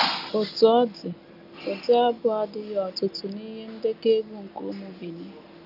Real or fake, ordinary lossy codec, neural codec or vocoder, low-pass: real; none; none; 5.4 kHz